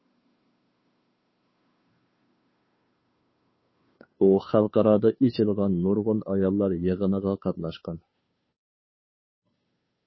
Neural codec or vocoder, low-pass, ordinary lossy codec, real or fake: codec, 16 kHz, 2 kbps, FunCodec, trained on Chinese and English, 25 frames a second; 7.2 kHz; MP3, 24 kbps; fake